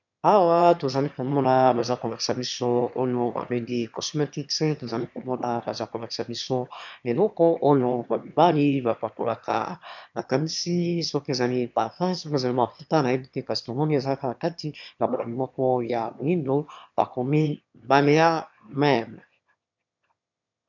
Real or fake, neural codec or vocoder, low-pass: fake; autoencoder, 22.05 kHz, a latent of 192 numbers a frame, VITS, trained on one speaker; 7.2 kHz